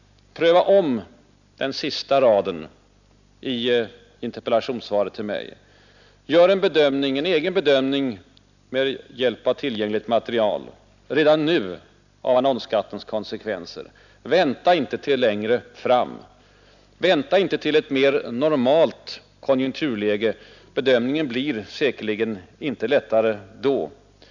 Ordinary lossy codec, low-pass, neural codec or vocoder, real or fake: none; 7.2 kHz; none; real